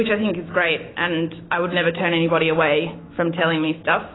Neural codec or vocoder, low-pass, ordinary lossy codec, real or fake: autoencoder, 48 kHz, 128 numbers a frame, DAC-VAE, trained on Japanese speech; 7.2 kHz; AAC, 16 kbps; fake